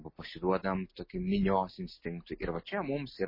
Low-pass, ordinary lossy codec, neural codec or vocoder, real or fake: 5.4 kHz; MP3, 24 kbps; none; real